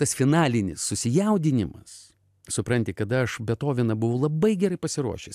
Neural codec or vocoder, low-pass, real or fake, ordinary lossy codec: none; 14.4 kHz; real; AAC, 96 kbps